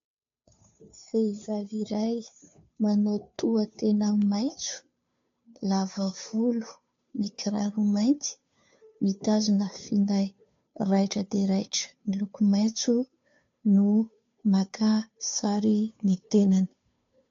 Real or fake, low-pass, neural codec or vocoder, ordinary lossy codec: fake; 7.2 kHz; codec, 16 kHz, 2 kbps, FunCodec, trained on Chinese and English, 25 frames a second; AAC, 48 kbps